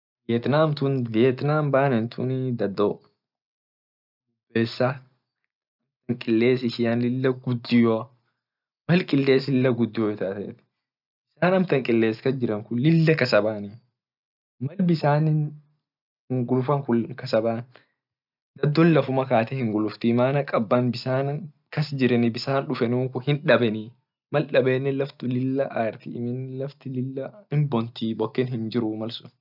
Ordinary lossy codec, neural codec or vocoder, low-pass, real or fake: none; none; 5.4 kHz; real